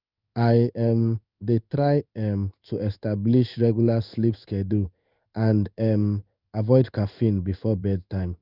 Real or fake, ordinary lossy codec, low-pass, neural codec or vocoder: fake; Opus, 64 kbps; 5.4 kHz; codec, 16 kHz in and 24 kHz out, 1 kbps, XY-Tokenizer